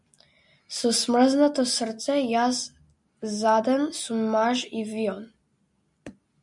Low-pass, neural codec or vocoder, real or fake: 10.8 kHz; none; real